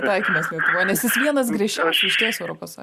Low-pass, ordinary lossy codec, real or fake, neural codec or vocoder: 14.4 kHz; Opus, 64 kbps; real; none